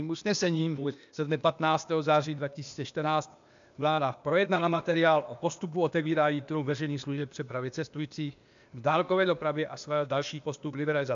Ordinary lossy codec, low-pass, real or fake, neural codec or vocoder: MP3, 64 kbps; 7.2 kHz; fake; codec, 16 kHz, 0.8 kbps, ZipCodec